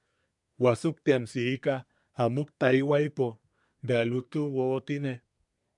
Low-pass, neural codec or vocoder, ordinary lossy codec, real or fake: 10.8 kHz; codec, 24 kHz, 1 kbps, SNAC; MP3, 96 kbps; fake